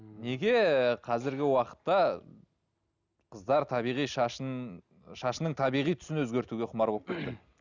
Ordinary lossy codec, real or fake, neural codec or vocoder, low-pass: none; real; none; 7.2 kHz